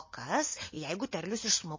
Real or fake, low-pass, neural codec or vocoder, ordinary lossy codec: real; 7.2 kHz; none; MP3, 32 kbps